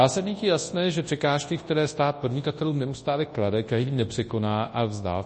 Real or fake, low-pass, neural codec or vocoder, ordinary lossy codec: fake; 10.8 kHz; codec, 24 kHz, 0.9 kbps, WavTokenizer, large speech release; MP3, 32 kbps